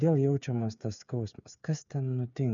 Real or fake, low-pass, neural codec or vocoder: fake; 7.2 kHz; codec, 16 kHz, 8 kbps, FreqCodec, smaller model